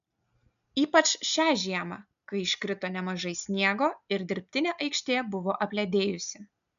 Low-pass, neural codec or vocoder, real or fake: 7.2 kHz; none; real